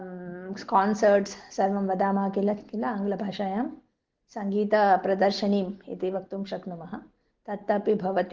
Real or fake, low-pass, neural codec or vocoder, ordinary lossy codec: real; 7.2 kHz; none; Opus, 16 kbps